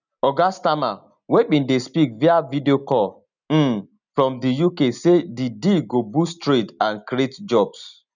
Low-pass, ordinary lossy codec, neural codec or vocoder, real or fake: 7.2 kHz; none; none; real